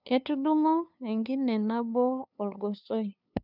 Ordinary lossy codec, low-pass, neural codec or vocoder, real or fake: none; 5.4 kHz; codec, 16 kHz, 2 kbps, FunCodec, trained on LibriTTS, 25 frames a second; fake